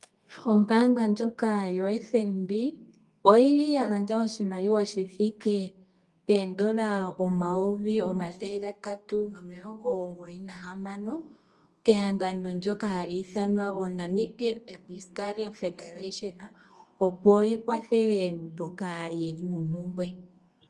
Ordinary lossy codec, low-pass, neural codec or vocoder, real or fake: Opus, 32 kbps; 10.8 kHz; codec, 24 kHz, 0.9 kbps, WavTokenizer, medium music audio release; fake